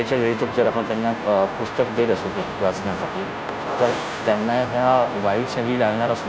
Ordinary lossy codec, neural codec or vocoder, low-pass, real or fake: none; codec, 16 kHz, 0.5 kbps, FunCodec, trained on Chinese and English, 25 frames a second; none; fake